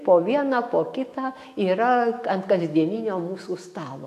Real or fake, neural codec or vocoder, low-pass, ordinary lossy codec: fake; autoencoder, 48 kHz, 128 numbers a frame, DAC-VAE, trained on Japanese speech; 14.4 kHz; AAC, 64 kbps